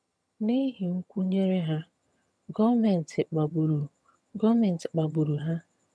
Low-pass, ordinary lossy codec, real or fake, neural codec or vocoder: none; none; fake; vocoder, 22.05 kHz, 80 mel bands, HiFi-GAN